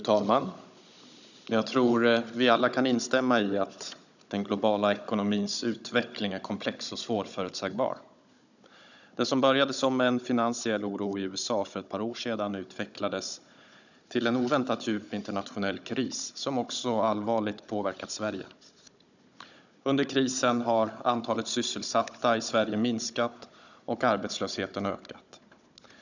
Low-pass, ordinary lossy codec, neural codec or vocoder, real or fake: 7.2 kHz; none; codec, 16 kHz, 16 kbps, FunCodec, trained on Chinese and English, 50 frames a second; fake